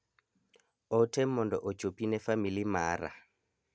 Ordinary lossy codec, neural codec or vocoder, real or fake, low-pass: none; none; real; none